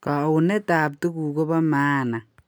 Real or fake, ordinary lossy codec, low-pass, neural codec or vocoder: real; none; none; none